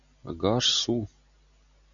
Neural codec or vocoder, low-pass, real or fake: none; 7.2 kHz; real